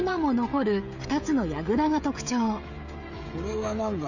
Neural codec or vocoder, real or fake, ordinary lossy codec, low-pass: codec, 16 kHz, 16 kbps, FreqCodec, smaller model; fake; Opus, 64 kbps; 7.2 kHz